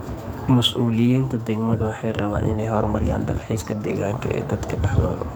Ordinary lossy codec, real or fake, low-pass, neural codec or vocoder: none; fake; none; codec, 44.1 kHz, 2.6 kbps, SNAC